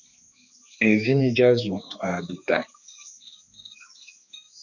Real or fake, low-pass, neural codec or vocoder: fake; 7.2 kHz; codec, 16 kHz, 2 kbps, X-Codec, HuBERT features, trained on balanced general audio